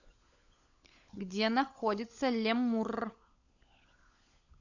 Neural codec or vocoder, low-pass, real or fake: codec, 16 kHz, 16 kbps, FunCodec, trained on LibriTTS, 50 frames a second; 7.2 kHz; fake